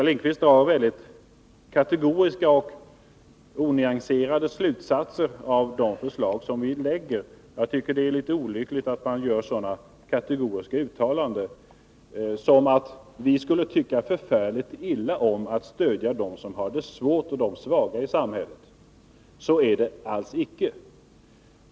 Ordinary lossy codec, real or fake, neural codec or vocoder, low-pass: none; real; none; none